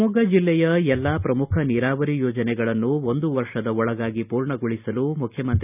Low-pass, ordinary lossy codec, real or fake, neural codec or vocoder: 3.6 kHz; none; real; none